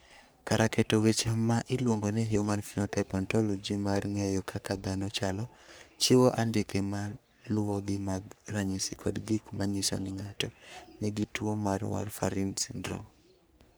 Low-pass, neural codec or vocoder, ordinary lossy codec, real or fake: none; codec, 44.1 kHz, 3.4 kbps, Pupu-Codec; none; fake